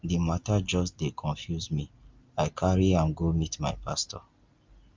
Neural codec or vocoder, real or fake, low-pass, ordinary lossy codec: none; real; 7.2 kHz; Opus, 32 kbps